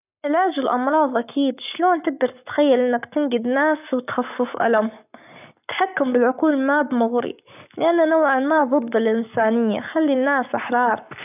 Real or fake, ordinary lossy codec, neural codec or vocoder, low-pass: fake; none; codec, 44.1 kHz, 7.8 kbps, Pupu-Codec; 3.6 kHz